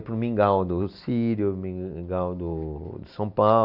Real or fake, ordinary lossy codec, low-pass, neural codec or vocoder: real; none; 5.4 kHz; none